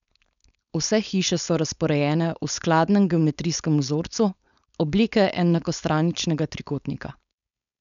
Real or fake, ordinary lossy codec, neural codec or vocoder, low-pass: fake; none; codec, 16 kHz, 4.8 kbps, FACodec; 7.2 kHz